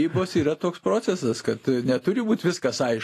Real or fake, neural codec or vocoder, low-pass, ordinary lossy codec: real; none; 14.4 kHz; AAC, 48 kbps